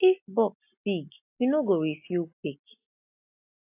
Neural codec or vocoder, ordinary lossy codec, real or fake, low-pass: none; AAC, 32 kbps; real; 3.6 kHz